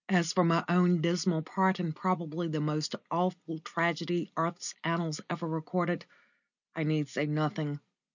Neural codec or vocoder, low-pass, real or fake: none; 7.2 kHz; real